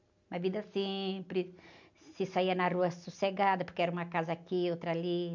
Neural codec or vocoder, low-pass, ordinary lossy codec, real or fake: none; 7.2 kHz; none; real